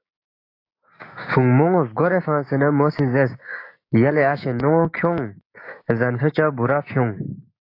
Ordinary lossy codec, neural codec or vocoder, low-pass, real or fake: AAC, 32 kbps; codec, 16 kHz, 6 kbps, DAC; 5.4 kHz; fake